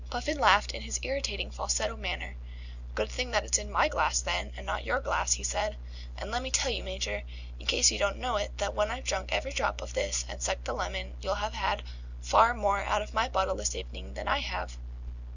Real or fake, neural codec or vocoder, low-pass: real; none; 7.2 kHz